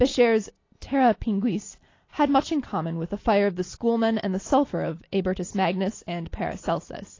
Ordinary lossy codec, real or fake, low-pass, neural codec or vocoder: AAC, 32 kbps; real; 7.2 kHz; none